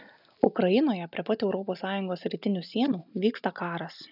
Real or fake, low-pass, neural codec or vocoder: real; 5.4 kHz; none